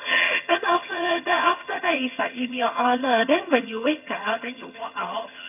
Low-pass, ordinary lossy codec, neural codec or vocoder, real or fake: 3.6 kHz; none; vocoder, 22.05 kHz, 80 mel bands, HiFi-GAN; fake